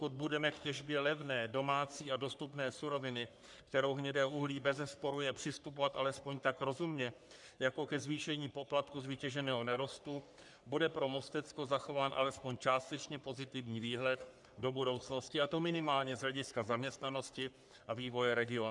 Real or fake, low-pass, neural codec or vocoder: fake; 10.8 kHz; codec, 44.1 kHz, 3.4 kbps, Pupu-Codec